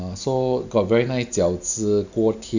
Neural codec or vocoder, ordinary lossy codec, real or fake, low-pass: none; none; real; 7.2 kHz